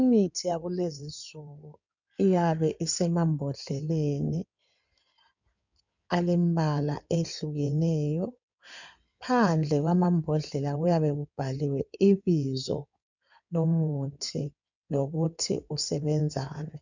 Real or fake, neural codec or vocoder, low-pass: fake; codec, 16 kHz in and 24 kHz out, 2.2 kbps, FireRedTTS-2 codec; 7.2 kHz